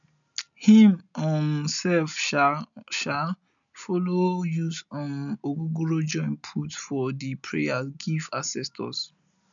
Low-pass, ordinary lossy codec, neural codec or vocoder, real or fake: 7.2 kHz; none; none; real